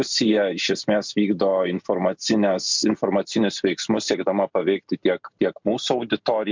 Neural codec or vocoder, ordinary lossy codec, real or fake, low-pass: none; MP3, 64 kbps; real; 7.2 kHz